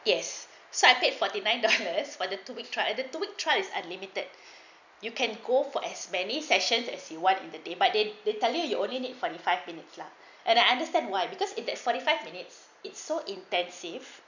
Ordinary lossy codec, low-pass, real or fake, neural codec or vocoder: none; 7.2 kHz; real; none